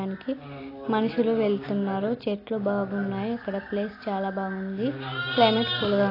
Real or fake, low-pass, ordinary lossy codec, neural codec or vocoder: real; 5.4 kHz; AAC, 24 kbps; none